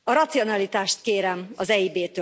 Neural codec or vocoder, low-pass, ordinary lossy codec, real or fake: none; none; none; real